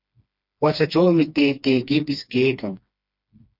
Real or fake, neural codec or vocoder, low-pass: fake; codec, 16 kHz, 2 kbps, FreqCodec, smaller model; 5.4 kHz